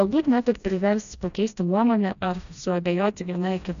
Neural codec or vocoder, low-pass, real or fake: codec, 16 kHz, 1 kbps, FreqCodec, smaller model; 7.2 kHz; fake